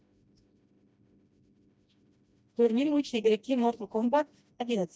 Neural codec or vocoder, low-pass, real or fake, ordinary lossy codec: codec, 16 kHz, 1 kbps, FreqCodec, smaller model; none; fake; none